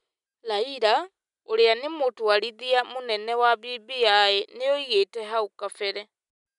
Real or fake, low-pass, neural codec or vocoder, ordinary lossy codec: real; 10.8 kHz; none; none